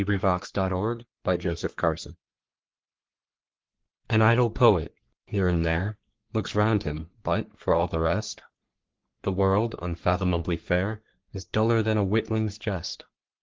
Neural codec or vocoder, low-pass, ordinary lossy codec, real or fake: codec, 44.1 kHz, 3.4 kbps, Pupu-Codec; 7.2 kHz; Opus, 16 kbps; fake